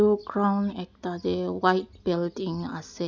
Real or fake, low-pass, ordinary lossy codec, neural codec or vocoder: real; 7.2 kHz; none; none